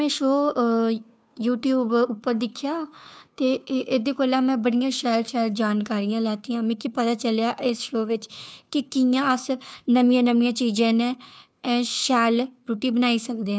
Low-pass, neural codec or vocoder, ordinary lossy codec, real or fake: none; codec, 16 kHz, 2 kbps, FunCodec, trained on Chinese and English, 25 frames a second; none; fake